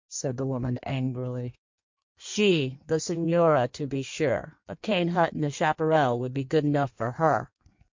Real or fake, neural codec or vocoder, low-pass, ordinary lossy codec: fake; codec, 16 kHz in and 24 kHz out, 1.1 kbps, FireRedTTS-2 codec; 7.2 kHz; MP3, 48 kbps